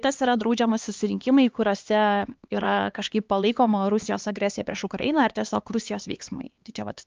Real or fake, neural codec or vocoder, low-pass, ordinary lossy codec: fake; codec, 16 kHz, 2 kbps, X-Codec, HuBERT features, trained on LibriSpeech; 7.2 kHz; Opus, 24 kbps